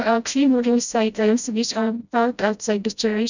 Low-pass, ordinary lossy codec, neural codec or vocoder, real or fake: 7.2 kHz; none; codec, 16 kHz, 0.5 kbps, FreqCodec, smaller model; fake